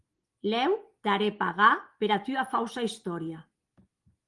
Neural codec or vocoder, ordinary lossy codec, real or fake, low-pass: none; Opus, 24 kbps; real; 10.8 kHz